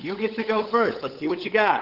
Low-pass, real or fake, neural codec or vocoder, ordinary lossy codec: 5.4 kHz; fake; codec, 16 kHz, 8 kbps, FunCodec, trained on LibriTTS, 25 frames a second; Opus, 16 kbps